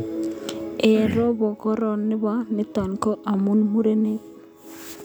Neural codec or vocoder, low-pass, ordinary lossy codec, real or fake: none; none; none; real